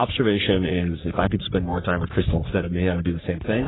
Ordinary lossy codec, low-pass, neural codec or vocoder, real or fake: AAC, 16 kbps; 7.2 kHz; codec, 44.1 kHz, 3.4 kbps, Pupu-Codec; fake